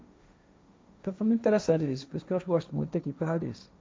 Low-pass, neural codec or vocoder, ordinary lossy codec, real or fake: none; codec, 16 kHz, 1.1 kbps, Voila-Tokenizer; none; fake